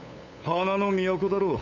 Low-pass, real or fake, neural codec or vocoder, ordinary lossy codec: 7.2 kHz; fake; codec, 16 kHz, 8 kbps, FunCodec, trained on LibriTTS, 25 frames a second; none